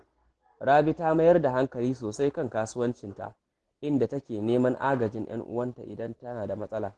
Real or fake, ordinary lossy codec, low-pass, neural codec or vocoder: real; Opus, 16 kbps; 10.8 kHz; none